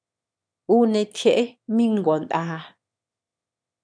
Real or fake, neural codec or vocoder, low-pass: fake; autoencoder, 22.05 kHz, a latent of 192 numbers a frame, VITS, trained on one speaker; 9.9 kHz